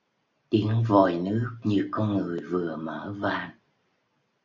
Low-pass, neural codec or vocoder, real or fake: 7.2 kHz; none; real